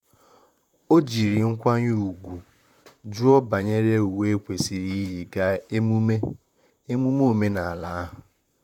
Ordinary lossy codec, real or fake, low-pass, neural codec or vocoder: none; fake; 19.8 kHz; vocoder, 44.1 kHz, 128 mel bands, Pupu-Vocoder